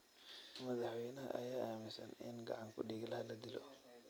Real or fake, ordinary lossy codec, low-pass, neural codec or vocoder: real; none; none; none